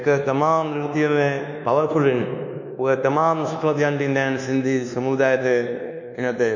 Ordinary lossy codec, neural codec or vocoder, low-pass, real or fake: none; codec, 24 kHz, 1.2 kbps, DualCodec; 7.2 kHz; fake